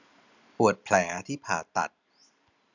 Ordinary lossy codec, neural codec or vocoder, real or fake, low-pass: none; none; real; 7.2 kHz